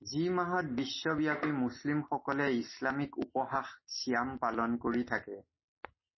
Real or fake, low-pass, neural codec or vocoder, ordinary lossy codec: real; 7.2 kHz; none; MP3, 24 kbps